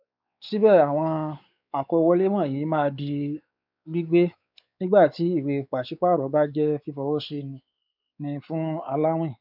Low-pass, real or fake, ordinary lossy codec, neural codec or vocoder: 5.4 kHz; fake; none; codec, 16 kHz, 4 kbps, X-Codec, WavLM features, trained on Multilingual LibriSpeech